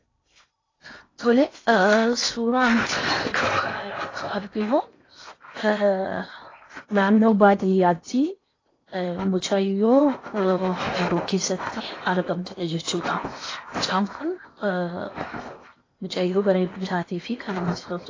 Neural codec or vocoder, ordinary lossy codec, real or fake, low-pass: codec, 16 kHz in and 24 kHz out, 0.8 kbps, FocalCodec, streaming, 65536 codes; AAC, 32 kbps; fake; 7.2 kHz